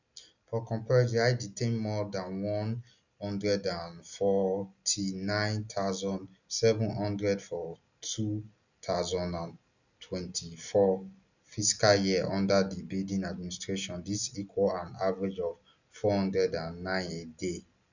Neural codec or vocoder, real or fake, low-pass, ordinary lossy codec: none; real; 7.2 kHz; none